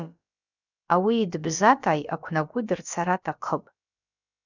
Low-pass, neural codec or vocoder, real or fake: 7.2 kHz; codec, 16 kHz, about 1 kbps, DyCAST, with the encoder's durations; fake